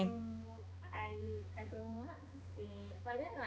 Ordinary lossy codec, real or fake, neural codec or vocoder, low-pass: none; fake; codec, 16 kHz, 2 kbps, X-Codec, HuBERT features, trained on balanced general audio; none